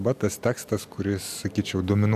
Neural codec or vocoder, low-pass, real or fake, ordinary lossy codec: none; 14.4 kHz; real; AAC, 96 kbps